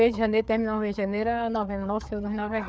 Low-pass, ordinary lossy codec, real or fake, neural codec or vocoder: none; none; fake; codec, 16 kHz, 4 kbps, FreqCodec, larger model